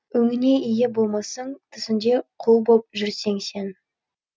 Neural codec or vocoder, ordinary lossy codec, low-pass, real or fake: none; none; none; real